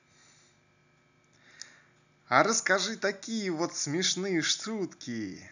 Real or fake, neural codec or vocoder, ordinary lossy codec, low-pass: real; none; none; 7.2 kHz